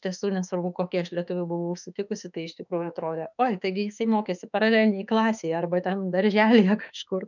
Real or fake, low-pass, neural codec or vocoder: fake; 7.2 kHz; autoencoder, 48 kHz, 32 numbers a frame, DAC-VAE, trained on Japanese speech